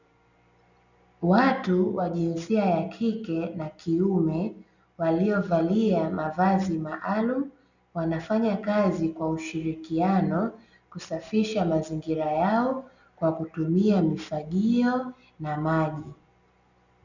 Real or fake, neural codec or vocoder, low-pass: real; none; 7.2 kHz